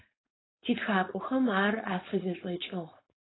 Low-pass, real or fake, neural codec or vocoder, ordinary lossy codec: 7.2 kHz; fake; codec, 16 kHz, 4.8 kbps, FACodec; AAC, 16 kbps